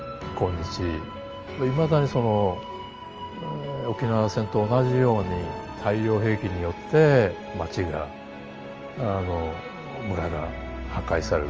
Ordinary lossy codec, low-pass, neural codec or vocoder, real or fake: Opus, 24 kbps; 7.2 kHz; none; real